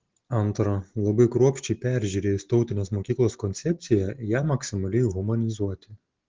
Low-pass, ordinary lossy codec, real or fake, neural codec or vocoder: 7.2 kHz; Opus, 16 kbps; real; none